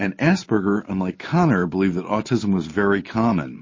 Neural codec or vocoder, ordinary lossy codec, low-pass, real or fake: none; MP3, 32 kbps; 7.2 kHz; real